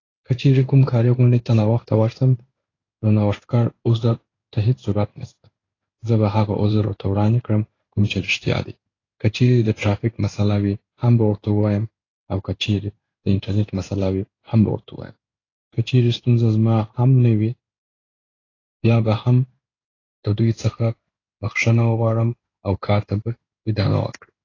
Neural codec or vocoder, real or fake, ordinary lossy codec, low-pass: codec, 16 kHz in and 24 kHz out, 1 kbps, XY-Tokenizer; fake; AAC, 32 kbps; 7.2 kHz